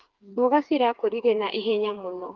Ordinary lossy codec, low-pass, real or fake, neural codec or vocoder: Opus, 32 kbps; 7.2 kHz; fake; codec, 16 kHz, 4 kbps, FreqCodec, smaller model